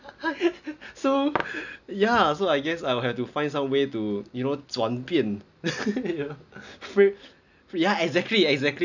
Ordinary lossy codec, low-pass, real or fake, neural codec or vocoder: none; 7.2 kHz; real; none